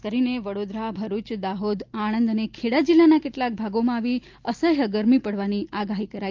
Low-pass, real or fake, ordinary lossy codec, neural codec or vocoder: 7.2 kHz; real; Opus, 24 kbps; none